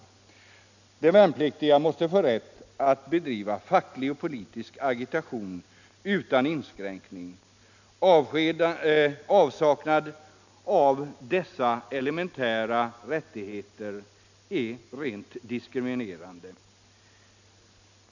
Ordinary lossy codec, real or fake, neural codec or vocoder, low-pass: none; real; none; 7.2 kHz